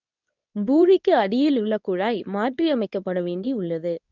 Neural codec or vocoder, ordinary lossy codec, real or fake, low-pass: codec, 24 kHz, 0.9 kbps, WavTokenizer, medium speech release version 2; none; fake; 7.2 kHz